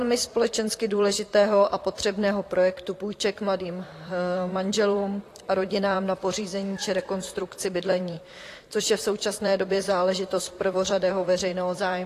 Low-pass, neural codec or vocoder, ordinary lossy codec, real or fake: 14.4 kHz; vocoder, 44.1 kHz, 128 mel bands, Pupu-Vocoder; AAC, 48 kbps; fake